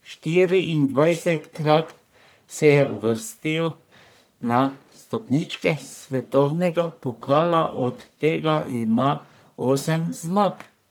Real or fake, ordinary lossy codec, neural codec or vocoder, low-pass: fake; none; codec, 44.1 kHz, 1.7 kbps, Pupu-Codec; none